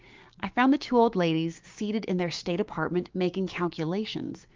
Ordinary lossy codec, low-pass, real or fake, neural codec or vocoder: Opus, 32 kbps; 7.2 kHz; fake; codec, 16 kHz, 6 kbps, DAC